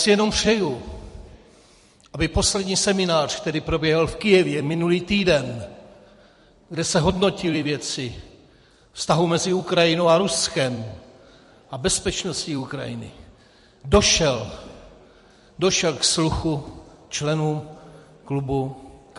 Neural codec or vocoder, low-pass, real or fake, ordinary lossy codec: vocoder, 44.1 kHz, 128 mel bands every 256 samples, BigVGAN v2; 14.4 kHz; fake; MP3, 48 kbps